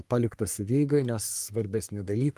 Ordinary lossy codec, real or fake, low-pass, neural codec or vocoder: Opus, 32 kbps; fake; 14.4 kHz; codec, 44.1 kHz, 3.4 kbps, Pupu-Codec